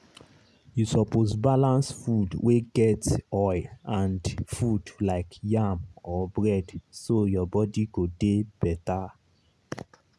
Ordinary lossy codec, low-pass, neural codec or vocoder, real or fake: none; none; none; real